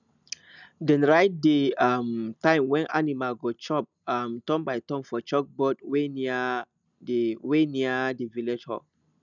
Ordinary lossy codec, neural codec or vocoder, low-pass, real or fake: none; none; 7.2 kHz; real